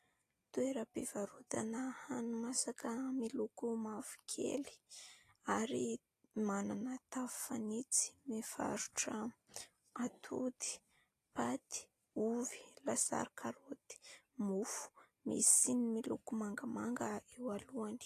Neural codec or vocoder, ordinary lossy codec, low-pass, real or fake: none; AAC, 48 kbps; 14.4 kHz; real